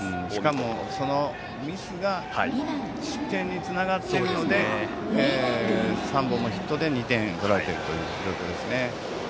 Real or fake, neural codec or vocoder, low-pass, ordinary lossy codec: real; none; none; none